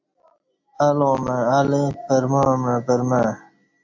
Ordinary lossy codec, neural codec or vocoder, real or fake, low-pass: AAC, 48 kbps; none; real; 7.2 kHz